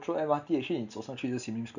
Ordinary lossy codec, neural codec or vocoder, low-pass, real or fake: none; none; 7.2 kHz; real